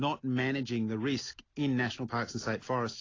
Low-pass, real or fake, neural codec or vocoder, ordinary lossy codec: 7.2 kHz; real; none; AAC, 32 kbps